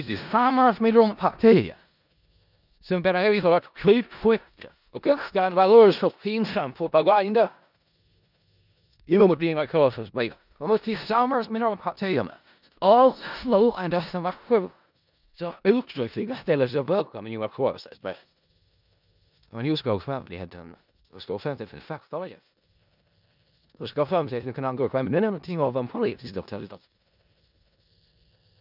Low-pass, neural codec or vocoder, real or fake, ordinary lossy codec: 5.4 kHz; codec, 16 kHz in and 24 kHz out, 0.4 kbps, LongCat-Audio-Codec, four codebook decoder; fake; none